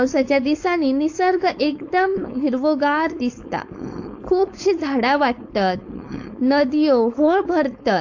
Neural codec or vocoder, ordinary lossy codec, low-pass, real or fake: codec, 16 kHz, 4.8 kbps, FACodec; AAC, 48 kbps; 7.2 kHz; fake